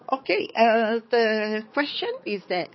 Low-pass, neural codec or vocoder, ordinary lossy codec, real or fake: 7.2 kHz; codec, 16 kHz, 4 kbps, X-Codec, HuBERT features, trained on balanced general audio; MP3, 24 kbps; fake